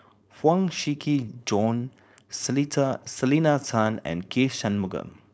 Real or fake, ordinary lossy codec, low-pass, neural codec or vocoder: fake; none; none; codec, 16 kHz, 4.8 kbps, FACodec